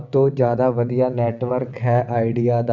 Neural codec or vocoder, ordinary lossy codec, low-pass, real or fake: vocoder, 22.05 kHz, 80 mel bands, WaveNeXt; none; 7.2 kHz; fake